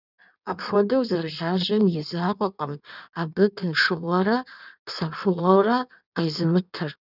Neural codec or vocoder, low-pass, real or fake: codec, 16 kHz in and 24 kHz out, 1.1 kbps, FireRedTTS-2 codec; 5.4 kHz; fake